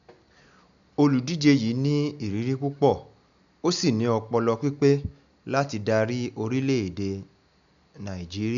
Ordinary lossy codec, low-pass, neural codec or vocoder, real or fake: none; 7.2 kHz; none; real